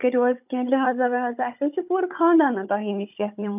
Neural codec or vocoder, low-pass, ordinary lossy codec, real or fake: codec, 16 kHz, 16 kbps, FunCodec, trained on Chinese and English, 50 frames a second; 3.6 kHz; none; fake